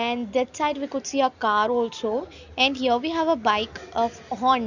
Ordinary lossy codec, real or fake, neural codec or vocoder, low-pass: none; real; none; 7.2 kHz